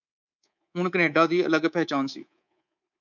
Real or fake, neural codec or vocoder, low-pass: fake; codec, 24 kHz, 3.1 kbps, DualCodec; 7.2 kHz